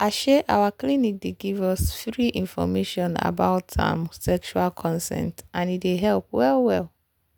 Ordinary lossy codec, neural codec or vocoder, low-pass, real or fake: none; none; none; real